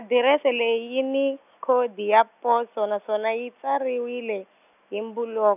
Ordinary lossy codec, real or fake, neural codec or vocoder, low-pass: none; real; none; 3.6 kHz